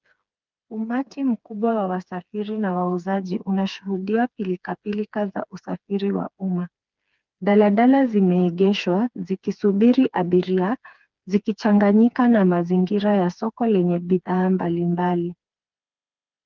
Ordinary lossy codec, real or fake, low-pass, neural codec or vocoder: Opus, 32 kbps; fake; 7.2 kHz; codec, 16 kHz, 4 kbps, FreqCodec, smaller model